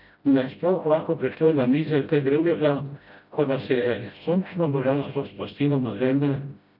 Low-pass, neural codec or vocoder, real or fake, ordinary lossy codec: 5.4 kHz; codec, 16 kHz, 0.5 kbps, FreqCodec, smaller model; fake; none